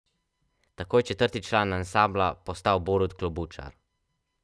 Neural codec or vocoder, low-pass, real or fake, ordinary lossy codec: none; none; real; none